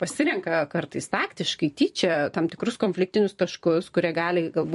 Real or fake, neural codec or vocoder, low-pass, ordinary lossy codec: fake; vocoder, 44.1 kHz, 128 mel bands every 256 samples, BigVGAN v2; 14.4 kHz; MP3, 48 kbps